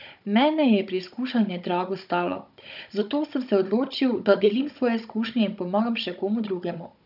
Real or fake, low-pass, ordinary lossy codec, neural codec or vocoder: fake; 5.4 kHz; none; codec, 16 kHz, 16 kbps, FunCodec, trained on Chinese and English, 50 frames a second